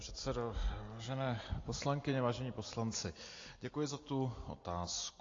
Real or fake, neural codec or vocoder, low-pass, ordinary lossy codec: real; none; 7.2 kHz; AAC, 32 kbps